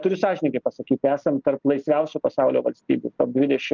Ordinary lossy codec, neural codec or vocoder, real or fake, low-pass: Opus, 24 kbps; none; real; 7.2 kHz